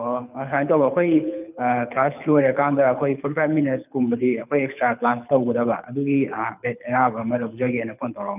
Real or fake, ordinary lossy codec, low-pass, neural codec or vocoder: fake; none; 3.6 kHz; codec, 24 kHz, 6 kbps, HILCodec